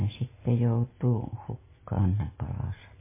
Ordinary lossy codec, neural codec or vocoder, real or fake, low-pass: MP3, 16 kbps; none; real; 3.6 kHz